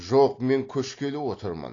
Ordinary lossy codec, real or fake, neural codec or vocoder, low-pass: AAC, 32 kbps; real; none; 7.2 kHz